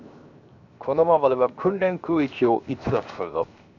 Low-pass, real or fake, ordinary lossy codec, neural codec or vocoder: 7.2 kHz; fake; none; codec, 16 kHz, 0.7 kbps, FocalCodec